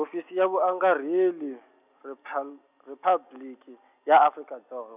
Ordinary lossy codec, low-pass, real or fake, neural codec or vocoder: none; 3.6 kHz; real; none